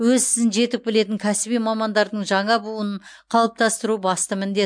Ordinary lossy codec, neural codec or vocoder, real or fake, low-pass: MP3, 64 kbps; none; real; 9.9 kHz